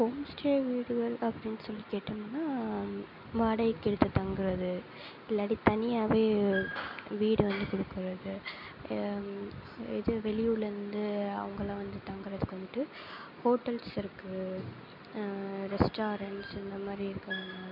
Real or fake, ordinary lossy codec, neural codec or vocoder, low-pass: real; none; none; 5.4 kHz